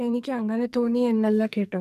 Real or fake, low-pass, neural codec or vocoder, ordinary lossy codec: fake; 14.4 kHz; codec, 44.1 kHz, 2.6 kbps, SNAC; none